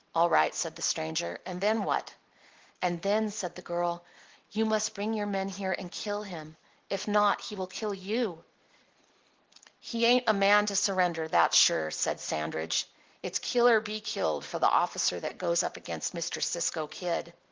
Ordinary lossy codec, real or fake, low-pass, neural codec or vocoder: Opus, 16 kbps; real; 7.2 kHz; none